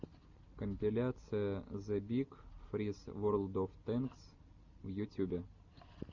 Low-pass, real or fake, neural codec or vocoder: 7.2 kHz; real; none